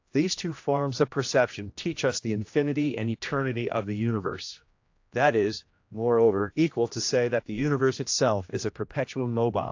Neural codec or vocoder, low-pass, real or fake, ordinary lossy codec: codec, 16 kHz, 1 kbps, X-Codec, HuBERT features, trained on general audio; 7.2 kHz; fake; AAC, 48 kbps